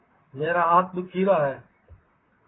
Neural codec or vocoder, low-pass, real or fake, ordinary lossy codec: vocoder, 44.1 kHz, 128 mel bands, Pupu-Vocoder; 7.2 kHz; fake; AAC, 16 kbps